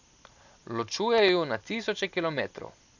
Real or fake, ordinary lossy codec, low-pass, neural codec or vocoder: real; none; 7.2 kHz; none